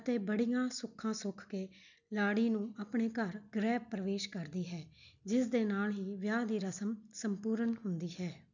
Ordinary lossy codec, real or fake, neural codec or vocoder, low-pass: none; real; none; 7.2 kHz